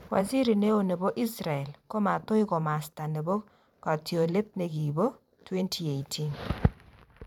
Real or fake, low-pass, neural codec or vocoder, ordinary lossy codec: fake; 19.8 kHz; vocoder, 44.1 kHz, 128 mel bands every 512 samples, BigVGAN v2; none